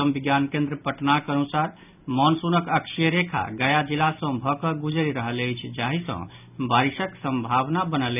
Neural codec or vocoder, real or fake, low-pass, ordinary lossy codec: none; real; 3.6 kHz; none